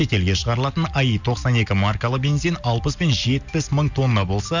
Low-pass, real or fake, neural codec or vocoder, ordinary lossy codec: 7.2 kHz; real; none; none